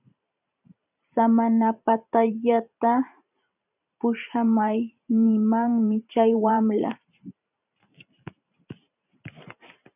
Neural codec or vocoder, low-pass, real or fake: vocoder, 44.1 kHz, 128 mel bands every 512 samples, BigVGAN v2; 3.6 kHz; fake